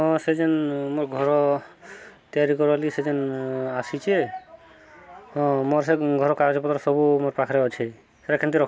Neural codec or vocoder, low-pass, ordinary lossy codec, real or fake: none; none; none; real